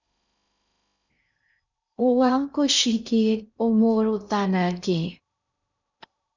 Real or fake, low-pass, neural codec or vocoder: fake; 7.2 kHz; codec, 16 kHz in and 24 kHz out, 0.6 kbps, FocalCodec, streaming, 2048 codes